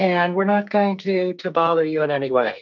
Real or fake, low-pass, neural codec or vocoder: fake; 7.2 kHz; codec, 44.1 kHz, 2.6 kbps, SNAC